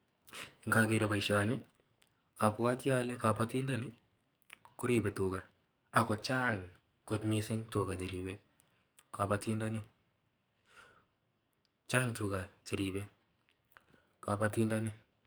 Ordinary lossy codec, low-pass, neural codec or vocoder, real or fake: none; none; codec, 44.1 kHz, 2.6 kbps, SNAC; fake